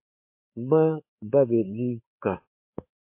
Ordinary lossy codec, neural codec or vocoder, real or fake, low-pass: MP3, 32 kbps; codec, 16 kHz, 4 kbps, FreqCodec, larger model; fake; 3.6 kHz